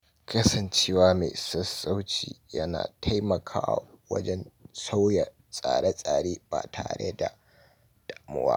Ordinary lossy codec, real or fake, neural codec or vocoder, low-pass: none; real; none; none